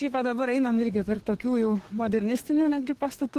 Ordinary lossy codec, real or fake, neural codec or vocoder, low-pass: Opus, 16 kbps; fake; codec, 32 kHz, 1.9 kbps, SNAC; 14.4 kHz